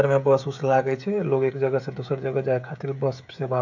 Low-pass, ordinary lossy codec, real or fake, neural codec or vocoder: 7.2 kHz; none; fake; codec, 16 kHz, 16 kbps, FreqCodec, smaller model